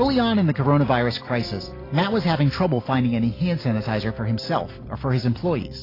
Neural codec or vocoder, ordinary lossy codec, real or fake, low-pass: none; AAC, 24 kbps; real; 5.4 kHz